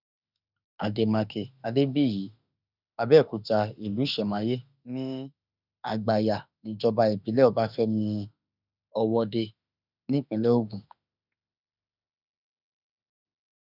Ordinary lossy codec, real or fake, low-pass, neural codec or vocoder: none; fake; 5.4 kHz; autoencoder, 48 kHz, 32 numbers a frame, DAC-VAE, trained on Japanese speech